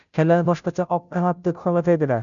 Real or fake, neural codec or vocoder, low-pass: fake; codec, 16 kHz, 0.5 kbps, FunCodec, trained on Chinese and English, 25 frames a second; 7.2 kHz